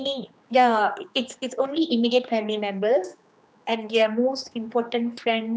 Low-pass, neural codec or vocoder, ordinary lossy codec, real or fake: none; codec, 16 kHz, 2 kbps, X-Codec, HuBERT features, trained on general audio; none; fake